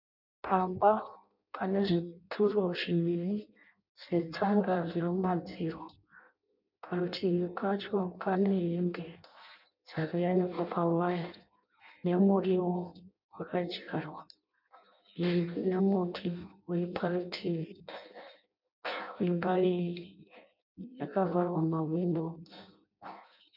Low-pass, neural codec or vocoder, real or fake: 5.4 kHz; codec, 16 kHz in and 24 kHz out, 0.6 kbps, FireRedTTS-2 codec; fake